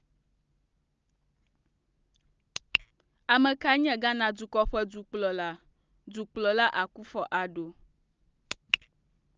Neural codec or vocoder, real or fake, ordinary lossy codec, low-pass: none; real; Opus, 24 kbps; 7.2 kHz